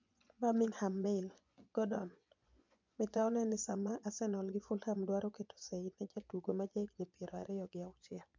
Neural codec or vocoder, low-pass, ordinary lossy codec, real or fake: vocoder, 24 kHz, 100 mel bands, Vocos; 7.2 kHz; MP3, 64 kbps; fake